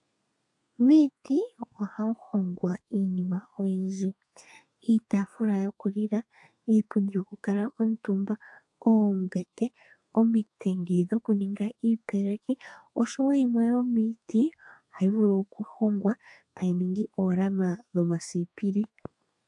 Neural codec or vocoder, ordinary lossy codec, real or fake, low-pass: codec, 32 kHz, 1.9 kbps, SNAC; AAC, 64 kbps; fake; 10.8 kHz